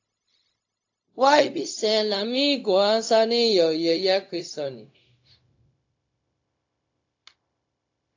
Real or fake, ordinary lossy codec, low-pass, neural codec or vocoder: fake; AAC, 48 kbps; 7.2 kHz; codec, 16 kHz, 0.4 kbps, LongCat-Audio-Codec